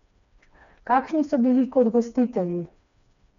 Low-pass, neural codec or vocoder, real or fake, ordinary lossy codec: 7.2 kHz; codec, 16 kHz, 2 kbps, FreqCodec, smaller model; fake; MP3, 64 kbps